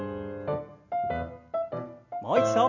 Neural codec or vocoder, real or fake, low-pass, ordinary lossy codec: none; real; 7.2 kHz; none